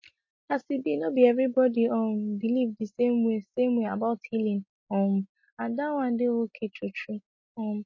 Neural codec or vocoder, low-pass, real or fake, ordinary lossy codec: none; 7.2 kHz; real; MP3, 32 kbps